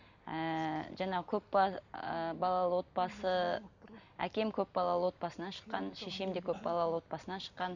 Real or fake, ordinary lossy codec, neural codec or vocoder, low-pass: real; none; none; 7.2 kHz